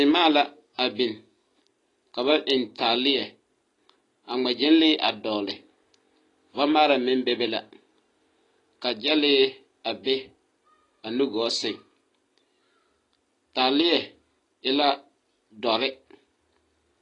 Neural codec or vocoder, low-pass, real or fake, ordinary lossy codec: none; 10.8 kHz; real; AAC, 32 kbps